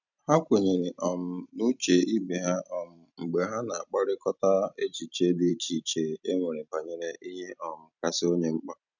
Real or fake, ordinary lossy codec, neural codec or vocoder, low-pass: fake; none; vocoder, 44.1 kHz, 128 mel bands every 256 samples, BigVGAN v2; 7.2 kHz